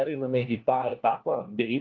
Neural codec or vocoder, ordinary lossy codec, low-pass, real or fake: codec, 16 kHz, 1 kbps, FunCodec, trained on LibriTTS, 50 frames a second; Opus, 32 kbps; 7.2 kHz; fake